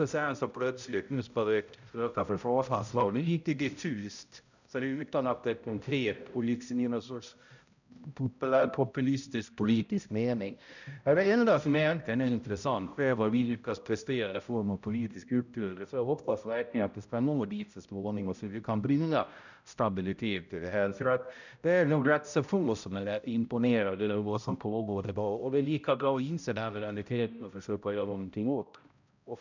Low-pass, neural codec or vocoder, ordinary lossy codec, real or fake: 7.2 kHz; codec, 16 kHz, 0.5 kbps, X-Codec, HuBERT features, trained on balanced general audio; none; fake